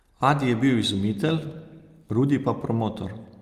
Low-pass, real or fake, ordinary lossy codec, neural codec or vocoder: 14.4 kHz; real; Opus, 32 kbps; none